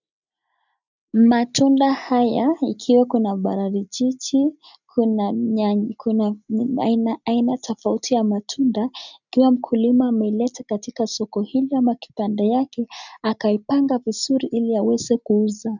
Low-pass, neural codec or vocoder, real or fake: 7.2 kHz; none; real